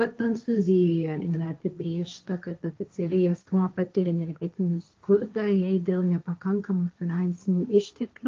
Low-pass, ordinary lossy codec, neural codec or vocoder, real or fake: 7.2 kHz; Opus, 24 kbps; codec, 16 kHz, 1.1 kbps, Voila-Tokenizer; fake